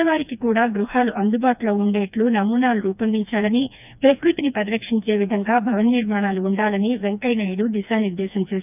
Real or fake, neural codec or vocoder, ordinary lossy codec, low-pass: fake; codec, 16 kHz, 2 kbps, FreqCodec, smaller model; none; 3.6 kHz